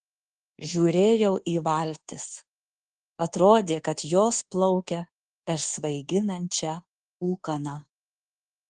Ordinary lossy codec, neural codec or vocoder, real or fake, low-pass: Opus, 16 kbps; codec, 24 kHz, 1.2 kbps, DualCodec; fake; 10.8 kHz